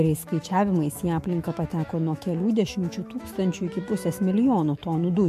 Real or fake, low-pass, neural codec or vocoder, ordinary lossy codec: fake; 14.4 kHz; autoencoder, 48 kHz, 128 numbers a frame, DAC-VAE, trained on Japanese speech; MP3, 64 kbps